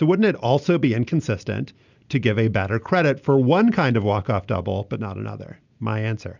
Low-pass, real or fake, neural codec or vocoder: 7.2 kHz; real; none